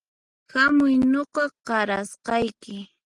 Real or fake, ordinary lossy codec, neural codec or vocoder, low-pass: real; Opus, 24 kbps; none; 10.8 kHz